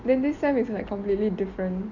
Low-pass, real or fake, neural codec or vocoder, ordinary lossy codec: 7.2 kHz; real; none; none